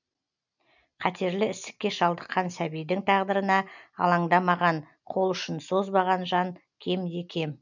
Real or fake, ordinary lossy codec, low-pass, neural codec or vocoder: real; none; 7.2 kHz; none